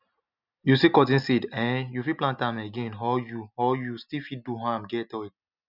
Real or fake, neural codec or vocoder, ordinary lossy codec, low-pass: real; none; none; 5.4 kHz